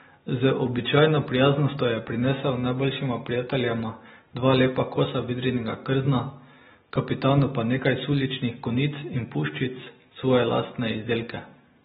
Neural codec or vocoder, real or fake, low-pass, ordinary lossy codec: none; real; 10.8 kHz; AAC, 16 kbps